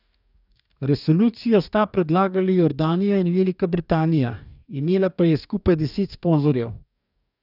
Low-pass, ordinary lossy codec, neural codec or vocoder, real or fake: 5.4 kHz; none; codec, 44.1 kHz, 2.6 kbps, DAC; fake